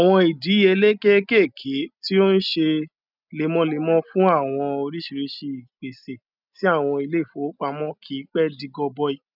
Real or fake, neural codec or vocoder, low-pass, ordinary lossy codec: real; none; 5.4 kHz; none